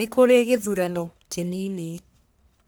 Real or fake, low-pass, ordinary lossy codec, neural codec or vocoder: fake; none; none; codec, 44.1 kHz, 1.7 kbps, Pupu-Codec